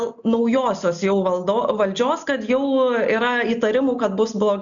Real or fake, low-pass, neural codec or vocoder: real; 7.2 kHz; none